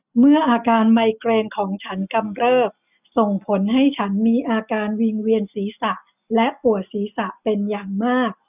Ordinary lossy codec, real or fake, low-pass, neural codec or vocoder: none; real; 3.6 kHz; none